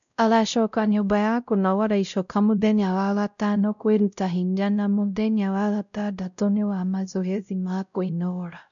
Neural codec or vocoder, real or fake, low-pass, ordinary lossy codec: codec, 16 kHz, 0.5 kbps, X-Codec, WavLM features, trained on Multilingual LibriSpeech; fake; 7.2 kHz; none